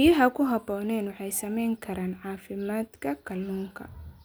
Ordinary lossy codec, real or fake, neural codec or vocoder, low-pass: none; real; none; none